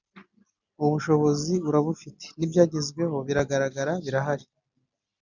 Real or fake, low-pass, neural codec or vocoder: real; 7.2 kHz; none